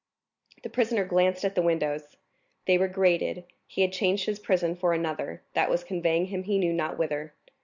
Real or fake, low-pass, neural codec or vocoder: real; 7.2 kHz; none